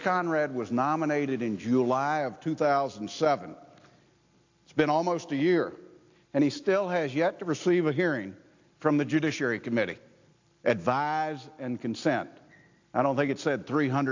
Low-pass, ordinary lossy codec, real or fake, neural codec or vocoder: 7.2 kHz; AAC, 48 kbps; real; none